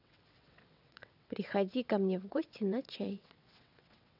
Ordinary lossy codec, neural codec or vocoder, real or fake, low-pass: none; vocoder, 22.05 kHz, 80 mel bands, WaveNeXt; fake; 5.4 kHz